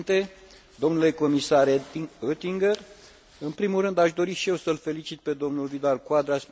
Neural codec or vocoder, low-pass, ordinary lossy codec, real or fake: none; none; none; real